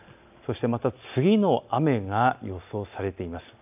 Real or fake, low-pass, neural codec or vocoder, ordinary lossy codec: real; 3.6 kHz; none; AAC, 32 kbps